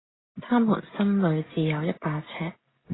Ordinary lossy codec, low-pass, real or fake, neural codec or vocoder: AAC, 16 kbps; 7.2 kHz; real; none